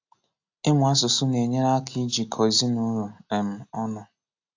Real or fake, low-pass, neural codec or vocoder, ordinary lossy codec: real; 7.2 kHz; none; AAC, 48 kbps